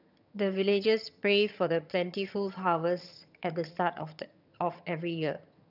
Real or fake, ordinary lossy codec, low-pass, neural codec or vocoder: fake; none; 5.4 kHz; vocoder, 22.05 kHz, 80 mel bands, HiFi-GAN